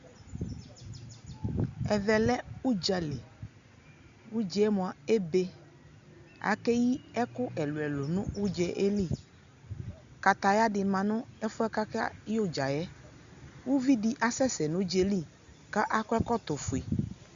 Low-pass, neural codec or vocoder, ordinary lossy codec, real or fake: 7.2 kHz; none; Opus, 64 kbps; real